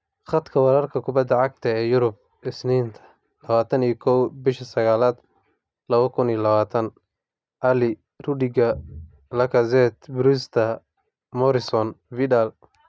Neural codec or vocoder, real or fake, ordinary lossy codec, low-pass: none; real; none; none